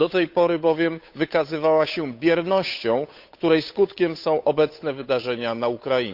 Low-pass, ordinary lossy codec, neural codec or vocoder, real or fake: 5.4 kHz; Opus, 64 kbps; codec, 16 kHz, 8 kbps, FunCodec, trained on Chinese and English, 25 frames a second; fake